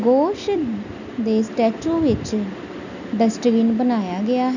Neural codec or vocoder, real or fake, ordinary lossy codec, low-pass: none; real; none; 7.2 kHz